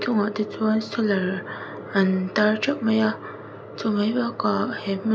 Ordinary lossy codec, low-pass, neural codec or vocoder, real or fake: none; none; none; real